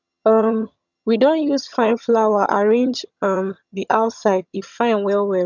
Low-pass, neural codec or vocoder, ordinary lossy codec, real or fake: 7.2 kHz; vocoder, 22.05 kHz, 80 mel bands, HiFi-GAN; none; fake